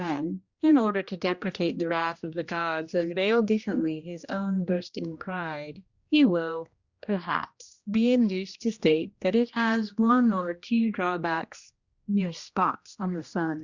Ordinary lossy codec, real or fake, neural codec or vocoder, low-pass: Opus, 64 kbps; fake; codec, 16 kHz, 1 kbps, X-Codec, HuBERT features, trained on general audio; 7.2 kHz